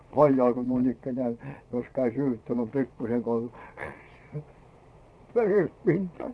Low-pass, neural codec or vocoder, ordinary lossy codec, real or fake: none; vocoder, 22.05 kHz, 80 mel bands, Vocos; none; fake